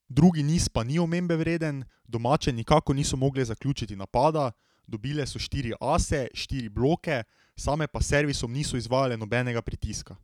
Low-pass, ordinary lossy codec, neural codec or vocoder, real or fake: 19.8 kHz; none; none; real